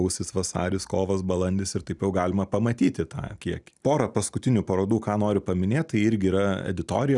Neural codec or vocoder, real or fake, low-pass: none; real; 10.8 kHz